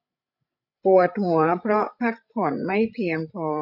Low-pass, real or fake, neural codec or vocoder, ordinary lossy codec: 5.4 kHz; fake; codec, 16 kHz, 16 kbps, FreqCodec, larger model; none